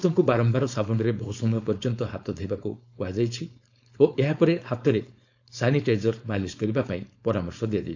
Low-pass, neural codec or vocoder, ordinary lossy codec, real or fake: 7.2 kHz; codec, 16 kHz, 4.8 kbps, FACodec; AAC, 48 kbps; fake